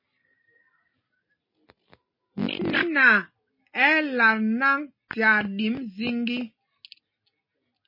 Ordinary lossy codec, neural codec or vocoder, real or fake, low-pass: MP3, 24 kbps; none; real; 5.4 kHz